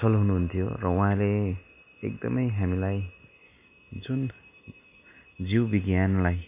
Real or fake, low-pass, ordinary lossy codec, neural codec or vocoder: real; 3.6 kHz; MP3, 24 kbps; none